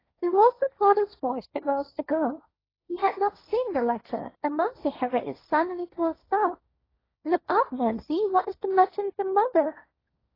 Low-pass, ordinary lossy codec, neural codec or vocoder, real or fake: 5.4 kHz; AAC, 24 kbps; codec, 16 kHz, 1.1 kbps, Voila-Tokenizer; fake